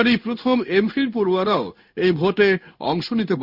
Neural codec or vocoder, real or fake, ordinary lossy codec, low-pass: codec, 16 kHz in and 24 kHz out, 1 kbps, XY-Tokenizer; fake; none; 5.4 kHz